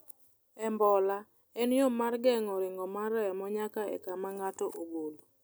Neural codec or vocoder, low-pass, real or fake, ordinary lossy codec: none; none; real; none